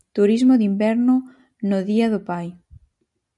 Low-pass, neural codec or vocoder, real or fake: 10.8 kHz; none; real